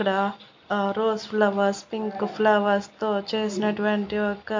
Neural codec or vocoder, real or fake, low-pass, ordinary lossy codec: none; real; 7.2 kHz; MP3, 48 kbps